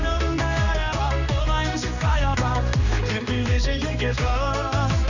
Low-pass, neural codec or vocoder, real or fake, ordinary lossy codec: 7.2 kHz; codec, 16 kHz, 2 kbps, X-Codec, HuBERT features, trained on general audio; fake; none